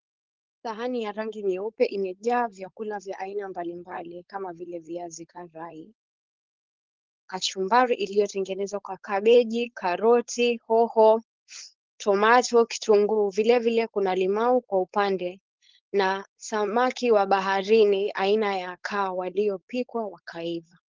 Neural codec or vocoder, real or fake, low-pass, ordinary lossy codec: codec, 16 kHz, 4.8 kbps, FACodec; fake; 7.2 kHz; Opus, 16 kbps